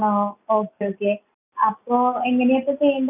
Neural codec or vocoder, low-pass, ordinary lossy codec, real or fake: none; 3.6 kHz; none; real